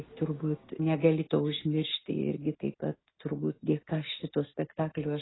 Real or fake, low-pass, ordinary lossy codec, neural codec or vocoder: real; 7.2 kHz; AAC, 16 kbps; none